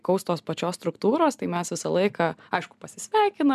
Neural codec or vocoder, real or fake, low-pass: none; real; 14.4 kHz